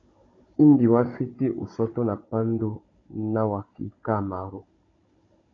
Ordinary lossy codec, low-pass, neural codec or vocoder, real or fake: AAC, 32 kbps; 7.2 kHz; codec, 16 kHz, 16 kbps, FunCodec, trained on Chinese and English, 50 frames a second; fake